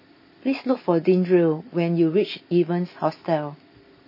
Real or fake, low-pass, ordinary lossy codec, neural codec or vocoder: real; 5.4 kHz; MP3, 24 kbps; none